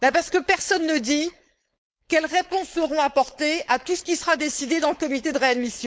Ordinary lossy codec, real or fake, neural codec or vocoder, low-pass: none; fake; codec, 16 kHz, 4.8 kbps, FACodec; none